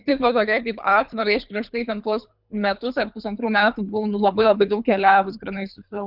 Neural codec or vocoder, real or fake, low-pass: codec, 24 kHz, 3 kbps, HILCodec; fake; 5.4 kHz